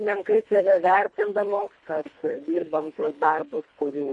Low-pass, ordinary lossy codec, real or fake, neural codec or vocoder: 10.8 kHz; MP3, 48 kbps; fake; codec, 24 kHz, 1.5 kbps, HILCodec